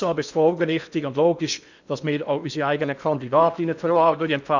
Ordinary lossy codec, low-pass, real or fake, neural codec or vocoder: none; 7.2 kHz; fake; codec, 16 kHz in and 24 kHz out, 0.8 kbps, FocalCodec, streaming, 65536 codes